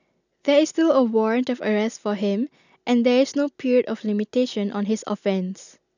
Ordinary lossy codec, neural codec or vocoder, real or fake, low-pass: none; none; real; 7.2 kHz